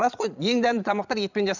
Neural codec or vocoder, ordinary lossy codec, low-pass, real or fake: vocoder, 22.05 kHz, 80 mel bands, Vocos; none; 7.2 kHz; fake